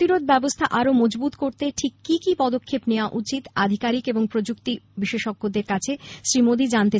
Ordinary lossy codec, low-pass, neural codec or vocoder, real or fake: none; none; none; real